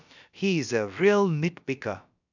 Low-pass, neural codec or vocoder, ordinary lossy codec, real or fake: 7.2 kHz; codec, 16 kHz, about 1 kbps, DyCAST, with the encoder's durations; none; fake